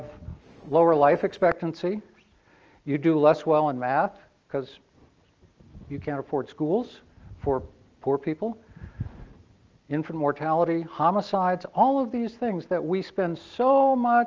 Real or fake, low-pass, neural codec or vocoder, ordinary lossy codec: real; 7.2 kHz; none; Opus, 24 kbps